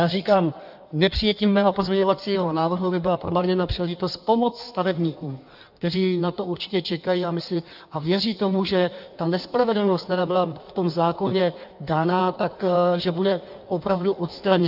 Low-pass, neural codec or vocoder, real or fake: 5.4 kHz; codec, 16 kHz in and 24 kHz out, 1.1 kbps, FireRedTTS-2 codec; fake